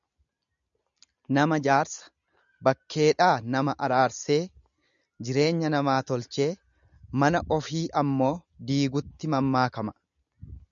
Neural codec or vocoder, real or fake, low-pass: none; real; 7.2 kHz